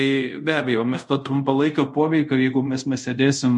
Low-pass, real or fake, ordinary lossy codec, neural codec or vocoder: 10.8 kHz; fake; MP3, 48 kbps; codec, 24 kHz, 0.5 kbps, DualCodec